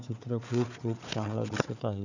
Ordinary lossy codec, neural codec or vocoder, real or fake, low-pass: none; codec, 16 kHz, 4 kbps, FunCodec, trained on Chinese and English, 50 frames a second; fake; 7.2 kHz